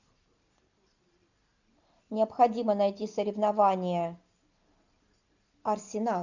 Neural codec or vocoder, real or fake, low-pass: none; real; 7.2 kHz